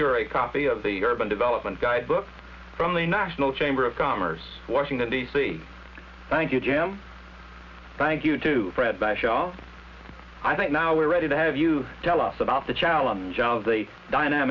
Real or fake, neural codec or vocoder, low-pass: real; none; 7.2 kHz